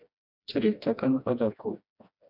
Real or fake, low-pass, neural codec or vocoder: fake; 5.4 kHz; codec, 16 kHz, 1 kbps, FreqCodec, smaller model